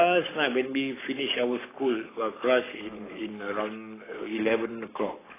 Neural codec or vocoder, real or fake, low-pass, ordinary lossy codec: codec, 44.1 kHz, 7.8 kbps, Pupu-Codec; fake; 3.6 kHz; AAC, 16 kbps